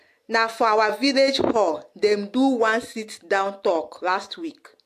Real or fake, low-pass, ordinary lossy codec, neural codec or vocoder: real; 14.4 kHz; AAC, 64 kbps; none